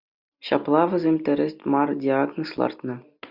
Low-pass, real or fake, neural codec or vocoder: 5.4 kHz; real; none